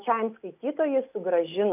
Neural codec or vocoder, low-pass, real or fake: none; 3.6 kHz; real